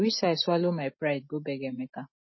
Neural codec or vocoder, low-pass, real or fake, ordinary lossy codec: none; 7.2 kHz; real; MP3, 24 kbps